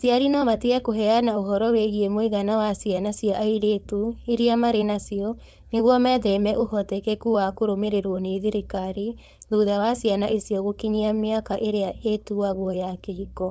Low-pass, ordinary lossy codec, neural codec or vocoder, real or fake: none; none; codec, 16 kHz, 4.8 kbps, FACodec; fake